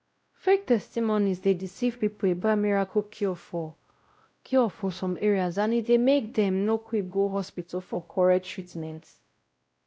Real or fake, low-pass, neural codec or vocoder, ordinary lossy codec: fake; none; codec, 16 kHz, 0.5 kbps, X-Codec, WavLM features, trained on Multilingual LibriSpeech; none